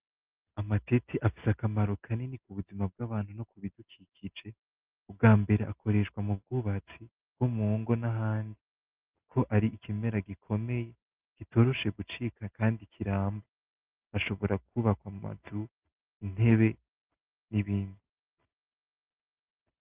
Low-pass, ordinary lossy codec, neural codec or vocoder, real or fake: 3.6 kHz; Opus, 32 kbps; none; real